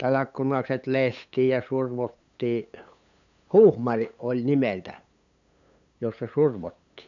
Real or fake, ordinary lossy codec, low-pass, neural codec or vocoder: fake; none; 7.2 kHz; codec, 16 kHz, 8 kbps, FunCodec, trained on LibriTTS, 25 frames a second